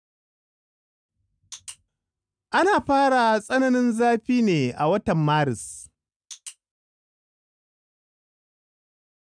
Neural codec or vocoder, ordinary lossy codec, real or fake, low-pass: none; none; real; 9.9 kHz